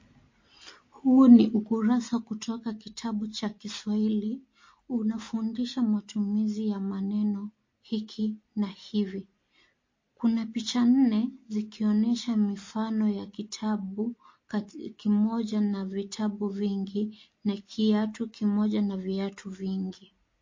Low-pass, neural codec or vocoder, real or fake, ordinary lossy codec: 7.2 kHz; none; real; MP3, 32 kbps